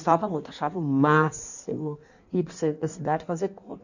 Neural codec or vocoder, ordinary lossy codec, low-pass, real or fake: codec, 16 kHz in and 24 kHz out, 1.1 kbps, FireRedTTS-2 codec; none; 7.2 kHz; fake